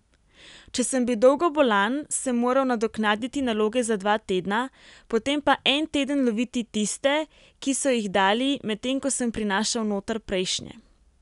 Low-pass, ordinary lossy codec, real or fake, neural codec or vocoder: 10.8 kHz; none; real; none